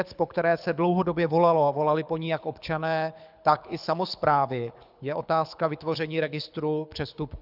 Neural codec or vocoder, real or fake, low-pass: codec, 24 kHz, 6 kbps, HILCodec; fake; 5.4 kHz